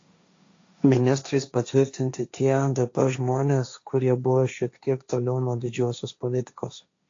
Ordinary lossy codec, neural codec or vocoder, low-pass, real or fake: AAC, 48 kbps; codec, 16 kHz, 1.1 kbps, Voila-Tokenizer; 7.2 kHz; fake